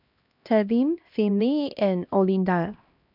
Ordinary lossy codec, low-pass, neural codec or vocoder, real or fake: none; 5.4 kHz; codec, 16 kHz, 1 kbps, X-Codec, HuBERT features, trained on LibriSpeech; fake